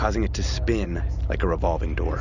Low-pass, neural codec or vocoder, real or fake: 7.2 kHz; none; real